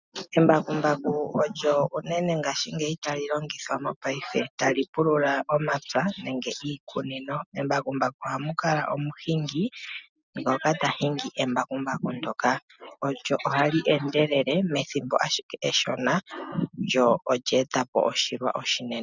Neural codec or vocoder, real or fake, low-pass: none; real; 7.2 kHz